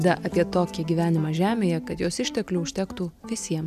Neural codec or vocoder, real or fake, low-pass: none; real; 14.4 kHz